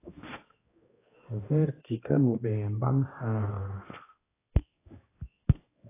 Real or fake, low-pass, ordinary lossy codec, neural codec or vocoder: fake; 3.6 kHz; AAC, 16 kbps; codec, 16 kHz, 1 kbps, X-Codec, HuBERT features, trained on balanced general audio